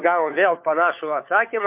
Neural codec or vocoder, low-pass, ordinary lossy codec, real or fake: codec, 16 kHz, 4 kbps, FunCodec, trained on LibriTTS, 50 frames a second; 3.6 kHz; MP3, 32 kbps; fake